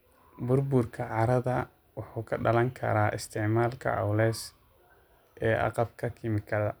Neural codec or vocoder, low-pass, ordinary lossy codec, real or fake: none; none; none; real